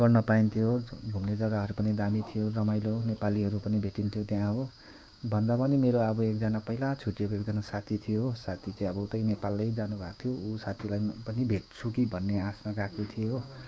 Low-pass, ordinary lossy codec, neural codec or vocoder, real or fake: none; none; codec, 16 kHz, 6 kbps, DAC; fake